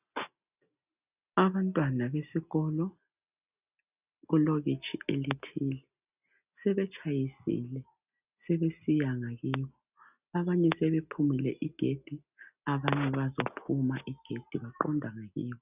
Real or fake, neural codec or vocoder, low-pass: real; none; 3.6 kHz